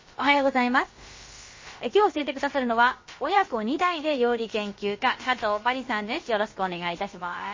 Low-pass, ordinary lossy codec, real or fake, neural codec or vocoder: 7.2 kHz; MP3, 32 kbps; fake; codec, 16 kHz, about 1 kbps, DyCAST, with the encoder's durations